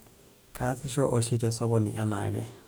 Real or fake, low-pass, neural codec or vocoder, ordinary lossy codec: fake; none; codec, 44.1 kHz, 2.6 kbps, DAC; none